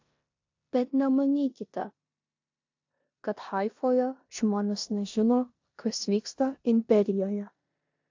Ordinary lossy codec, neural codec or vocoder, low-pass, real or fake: AAC, 48 kbps; codec, 16 kHz in and 24 kHz out, 0.9 kbps, LongCat-Audio-Codec, four codebook decoder; 7.2 kHz; fake